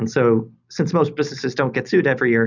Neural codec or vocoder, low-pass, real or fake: none; 7.2 kHz; real